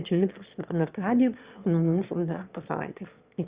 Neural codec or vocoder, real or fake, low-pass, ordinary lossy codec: autoencoder, 22.05 kHz, a latent of 192 numbers a frame, VITS, trained on one speaker; fake; 3.6 kHz; Opus, 64 kbps